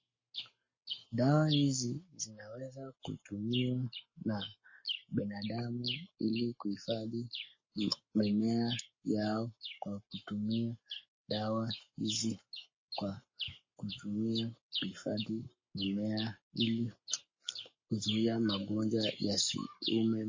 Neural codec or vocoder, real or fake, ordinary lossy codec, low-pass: codec, 44.1 kHz, 7.8 kbps, Pupu-Codec; fake; MP3, 32 kbps; 7.2 kHz